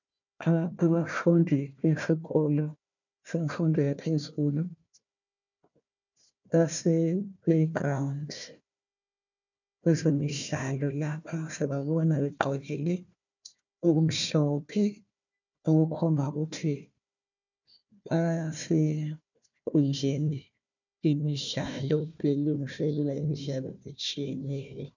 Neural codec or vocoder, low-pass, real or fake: codec, 16 kHz, 1 kbps, FunCodec, trained on Chinese and English, 50 frames a second; 7.2 kHz; fake